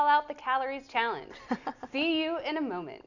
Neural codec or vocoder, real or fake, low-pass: none; real; 7.2 kHz